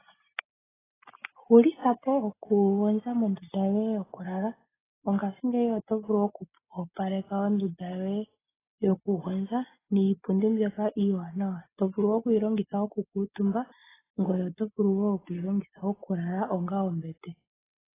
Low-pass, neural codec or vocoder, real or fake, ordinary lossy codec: 3.6 kHz; none; real; AAC, 16 kbps